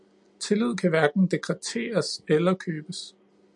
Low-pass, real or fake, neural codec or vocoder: 10.8 kHz; real; none